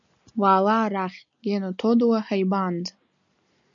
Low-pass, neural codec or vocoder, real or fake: 7.2 kHz; none; real